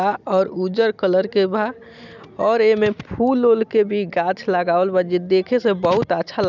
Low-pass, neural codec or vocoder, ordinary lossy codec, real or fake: 7.2 kHz; none; none; real